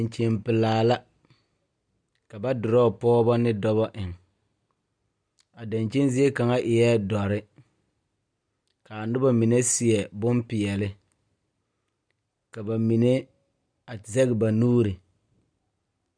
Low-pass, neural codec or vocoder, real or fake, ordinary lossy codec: 9.9 kHz; none; real; MP3, 96 kbps